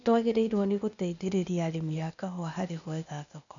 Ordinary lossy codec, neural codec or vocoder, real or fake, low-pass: none; codec, 16 kHz, 0.8 kbps, ZipCodec; fake; 7.2 kHz